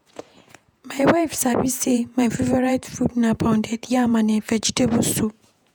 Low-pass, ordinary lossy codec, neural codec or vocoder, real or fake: none; none; vocoder, 48 kHz, 128 mel bands, Vocos; fake